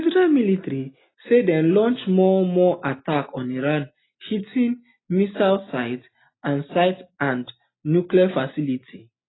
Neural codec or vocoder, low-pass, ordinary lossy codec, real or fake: none; 7.2 kHz; AAC, 16 kbps; real